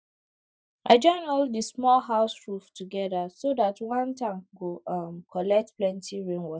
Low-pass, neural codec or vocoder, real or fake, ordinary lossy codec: none; none; real; none